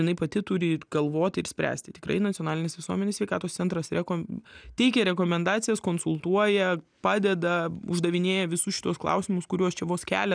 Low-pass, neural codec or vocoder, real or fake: 9.9 kHz; none; real